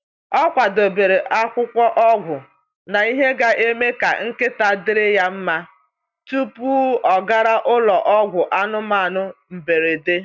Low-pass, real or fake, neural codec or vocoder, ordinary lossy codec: 7.2 kHz; real; none; none